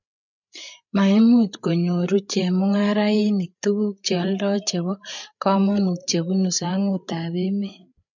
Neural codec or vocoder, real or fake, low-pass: codec, 16 kHz, 8 kbps, FreqCodec, larger model; fake; 7.2 kHz